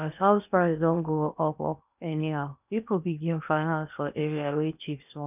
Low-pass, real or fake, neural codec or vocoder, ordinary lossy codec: 3.6 kHz; fake; codec, 16 kHz in and 24 kHz out, 0.8 kbps, FocalCodec, streaming, 65536 codes; none